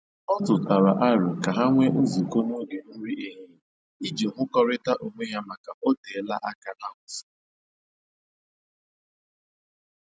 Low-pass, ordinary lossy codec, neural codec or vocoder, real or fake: none; none; none; real